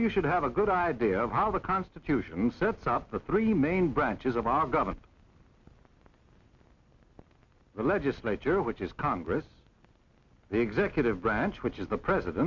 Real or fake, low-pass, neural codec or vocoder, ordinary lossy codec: real; 7.2 kHz; none; Opus, 64 kbps